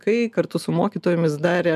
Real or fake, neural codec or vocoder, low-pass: real; none; 14.4 kHz